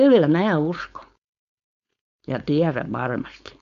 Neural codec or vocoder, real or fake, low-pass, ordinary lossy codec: codec, 16 kHz, 4.8 kbps, FACodec; fake; 7.2 kHz; AAC, 96 kbps